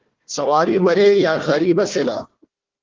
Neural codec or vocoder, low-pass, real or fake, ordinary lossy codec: codec, 16 kHz, 1 kbps, FunCodec, trained on Chinese and English, 50 frames a second; 7.2 kHz; fake; Opus, 16 kbps